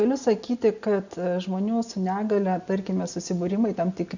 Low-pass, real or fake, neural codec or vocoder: 7.2 kHz; real; none